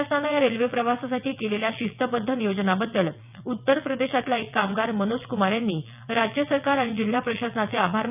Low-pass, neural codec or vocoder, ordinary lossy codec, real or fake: 3.6 kHz; vocoder, 22.05 kHz, 80 mel bands, WaveNeXt; none; fake